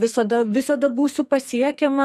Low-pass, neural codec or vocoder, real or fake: 14.4 kHz; codec, 44.1 kHz, 2.6 kbps, SNAC; fake